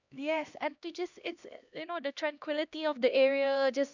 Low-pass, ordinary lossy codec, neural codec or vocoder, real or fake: 7.2 kHz; none; codec, 16 kHz, 1 kbps, X-Codec, HuBERT features, trained on LibriSpeech; fake